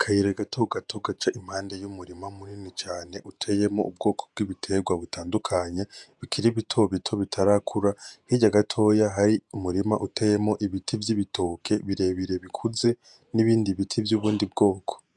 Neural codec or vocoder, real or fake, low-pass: vocoder, 48 kHz, 128 mel bands, Vocos; fake; 10.8 kHz